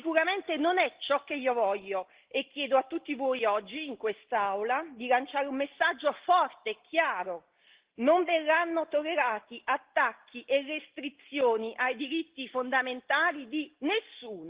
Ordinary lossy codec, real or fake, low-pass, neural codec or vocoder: Opus, 16 kbps; real; 3.6 kHz; none